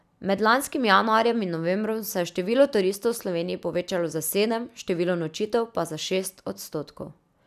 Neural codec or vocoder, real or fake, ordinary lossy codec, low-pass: none; real; none; 14.4 kHz